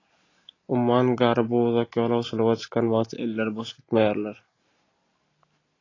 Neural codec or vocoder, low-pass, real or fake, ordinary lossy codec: none; 7.2 kHz; real; AAC, 32 kbps